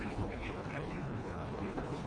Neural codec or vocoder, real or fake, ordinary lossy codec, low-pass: codec, 24 kHz, 1.5 kbps, HILCodec; fake; AAC, 64 kbps; 9.9 kHz